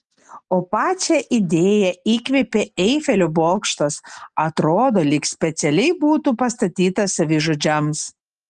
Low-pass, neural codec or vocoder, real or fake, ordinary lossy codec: 10.8 kHz; none; real; Opus, 24 kbps